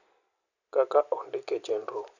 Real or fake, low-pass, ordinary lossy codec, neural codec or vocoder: real; 7.2 kHz; none; none